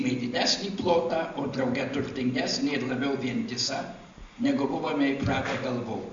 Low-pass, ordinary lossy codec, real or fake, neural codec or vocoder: 7.2 kHz; MP3, 48 kbps; real; none